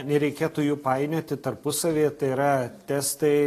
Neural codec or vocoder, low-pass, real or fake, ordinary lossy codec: none; 14.4 kHz; real; AAC, 48 kbps